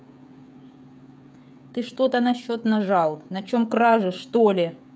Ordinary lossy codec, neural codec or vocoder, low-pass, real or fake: none; codec, 16 kHz, 16 kbps, FreqCodec, smaller model; none; fake